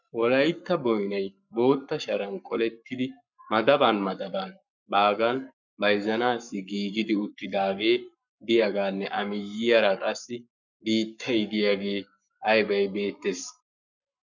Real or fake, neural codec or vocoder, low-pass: fake; codec, 44.1 kHz, 7.8 kbps, Pupu-Codec; 7.2 kHz